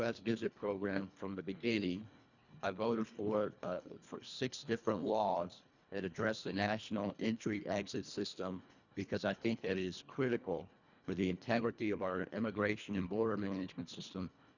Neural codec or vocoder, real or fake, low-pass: codec, 24 kHz, 1.5 kbps, HILCodec; fake; 7.2 kHz